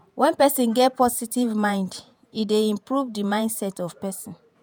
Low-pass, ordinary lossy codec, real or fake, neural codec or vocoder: none; none; fake; vocoder, 48 kHz, 128 mel bands, Vocos